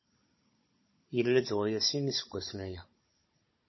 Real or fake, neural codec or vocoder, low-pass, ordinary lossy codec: fake; codec, 16 kHz, 16 kbps, FunCodec, trained on Chinese and English, 50 frames a second; 7.2 kHz; MP3, 24 kbps